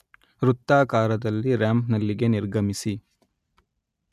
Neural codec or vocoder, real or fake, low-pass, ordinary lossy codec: none; real; 14.4 kHz; none